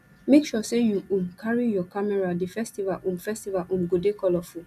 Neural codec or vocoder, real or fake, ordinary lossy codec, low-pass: none; real; none; 14.4 kHz